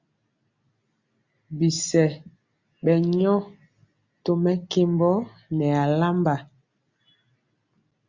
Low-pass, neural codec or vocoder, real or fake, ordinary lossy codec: 7.2 kHz; none; real; Opus, 64 kbps